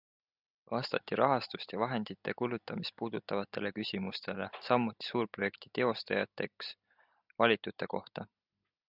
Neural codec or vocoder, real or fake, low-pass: none; real; 5.4 kHz